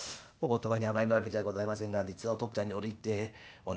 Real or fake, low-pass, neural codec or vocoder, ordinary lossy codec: fake; none; codec, 16 kHz, 0.8 kbps, ZipCodec; none